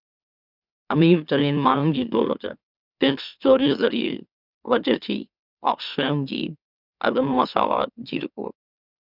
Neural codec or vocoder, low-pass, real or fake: autoencoder, 44.1 kHz, a latent of 192 numbers a frame, MeloTTS; 5.4 kHz; fake